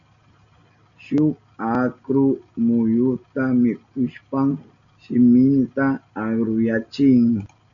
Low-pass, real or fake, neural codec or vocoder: 7.2 kHz; real; none